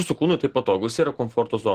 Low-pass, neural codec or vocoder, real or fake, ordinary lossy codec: 14.4 kHz; none; real; Opus, 16 kbps